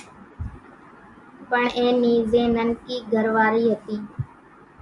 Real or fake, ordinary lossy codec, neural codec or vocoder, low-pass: real; MP3, 64 kbps; none; 10.8 kHz